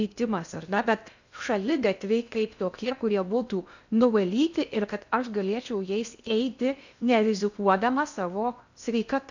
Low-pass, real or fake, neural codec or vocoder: 7.2 kHz; fake; codec, 16 kHz in and 24 kHz out, 0.6 kbps, FocalCodec, streaming, 2048 codes